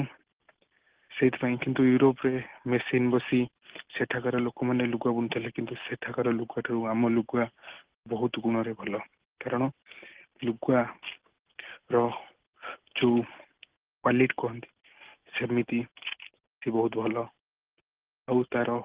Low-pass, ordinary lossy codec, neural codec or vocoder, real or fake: 3.6 kHz; Opus, 16 kbps; none; real